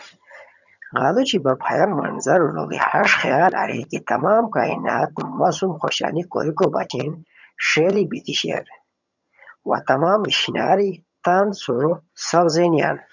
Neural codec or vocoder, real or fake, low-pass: vocoder, 22.05 kHz, 80 mel bands, HiFi-GAN; fake; 7.2 kHz